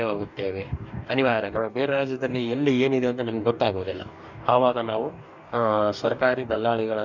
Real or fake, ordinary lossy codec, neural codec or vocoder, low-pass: fake; none; codec, 44.1 kHz, 2.6 kbps, DAC; 7.2 kHz